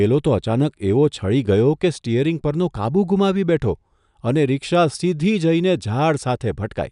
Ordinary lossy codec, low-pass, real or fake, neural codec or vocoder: none; 10.8 kHz; real; none